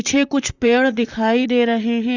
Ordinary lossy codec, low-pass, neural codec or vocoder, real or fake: Opus, 64 kbps; 7.2 kHz; vocoder, 44.1 kHz, 128 mel bands, Pupu-Vocoder; fake